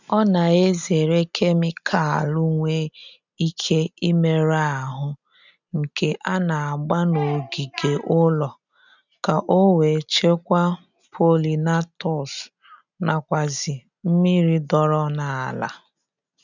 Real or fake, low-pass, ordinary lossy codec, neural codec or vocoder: real; 7.2 kHz; none; none